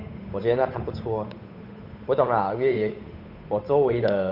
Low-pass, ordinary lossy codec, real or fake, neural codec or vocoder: 5.4 kHz; none; fake; codec, 16 kHz, 8 kbps, FunCodec, trained on Chinese and English, 25 frames a second